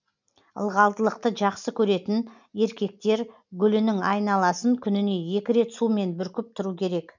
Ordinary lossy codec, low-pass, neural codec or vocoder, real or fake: none; 7.2 kHz; none; real